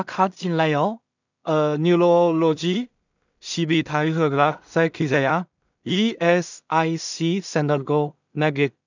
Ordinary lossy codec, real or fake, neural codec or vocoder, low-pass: none; fake; codec, 16 kHz in and 24 kHz out, 0.4 kbps, LongCat-Audio-Codec, two codebook decoder; 7.2 kHz